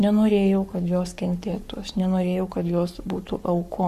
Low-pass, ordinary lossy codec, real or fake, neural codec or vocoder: 14.4 kHz; Opus, 64 kbps; fake; codec, 44.1 kHz, 7.8 kbps, Pupu-Codec